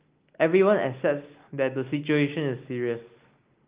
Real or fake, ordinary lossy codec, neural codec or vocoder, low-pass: real; Opus, 32 kbps; none; 3.6 kHz